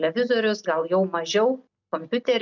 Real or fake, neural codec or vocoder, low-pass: real; none; 7.2 kHz